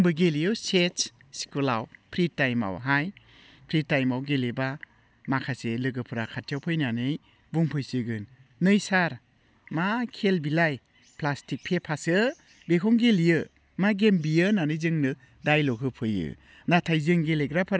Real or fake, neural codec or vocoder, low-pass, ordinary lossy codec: real; none; none; none